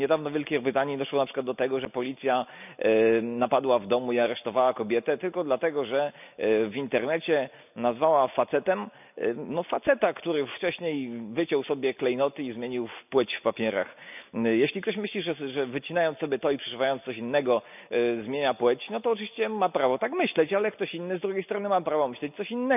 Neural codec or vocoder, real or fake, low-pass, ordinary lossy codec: none; real; 3.6 kHz; none